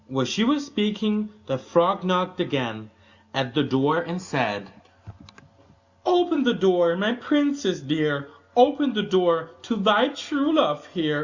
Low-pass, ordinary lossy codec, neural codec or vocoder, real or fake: 7.2 kHz; Opus, 64 kbps; none; real